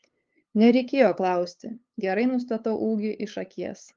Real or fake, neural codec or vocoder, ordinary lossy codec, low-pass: fake; codec, 16 kHz, 8 kbps, FunCodec, trained on LibriTTS, 25 frames a second; Opus, 32 kbps; 7.2 kHz